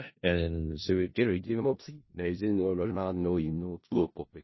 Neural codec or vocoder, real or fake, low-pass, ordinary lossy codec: codec, 16 kHz in and 24 kHz out, 0.4 kbps, LongCat-Audio-Codec, four codebook decoder; fake; 7.2 kHz; MP3, 24 kbps